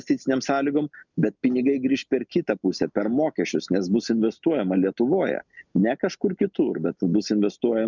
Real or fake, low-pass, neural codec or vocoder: real; 7.2 kHz; none